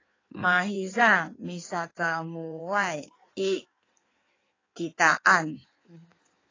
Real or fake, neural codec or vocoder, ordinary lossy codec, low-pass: fake; codec, 16 kHz in and 24 kHz out, 1.1 kbps, FireRedTTS-2 codec; AAC, 32 kbps; 7.2 kHz